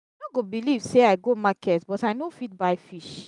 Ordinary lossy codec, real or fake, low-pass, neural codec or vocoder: none; real; 10.8 kHz; none